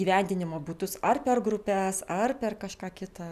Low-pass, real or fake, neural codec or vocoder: 14.4 kHz; real; none